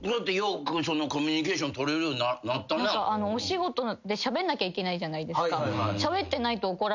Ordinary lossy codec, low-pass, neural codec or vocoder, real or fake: none; 7.2 kHz; none; real